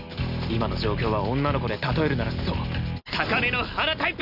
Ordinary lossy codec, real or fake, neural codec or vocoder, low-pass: none; real; none; 5.4 kHz